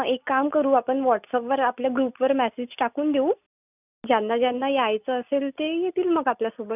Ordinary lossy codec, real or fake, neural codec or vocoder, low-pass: none; real; none; 3.6 kHz